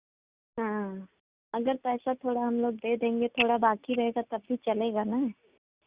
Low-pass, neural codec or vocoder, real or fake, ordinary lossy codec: 3.6 kHz; none; real; Opus, 64 kbps